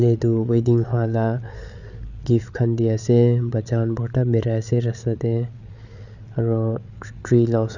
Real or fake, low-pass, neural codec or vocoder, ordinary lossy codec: fake; 7.2 kHz; codec, 16 kHz, 8 kbps, FreqCodec, larger model; none